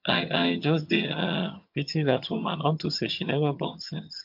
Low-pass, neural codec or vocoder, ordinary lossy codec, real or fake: 5.4 kHz; vocoder, 22.05 kHz, 80 mel bands, HiFi-GAN; MP3, 48 kbps; fake